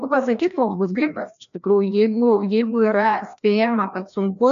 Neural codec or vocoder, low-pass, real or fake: codec, 16 kHz, 1 kbps, FreqCodec, larger model; 7.2 kHz; fake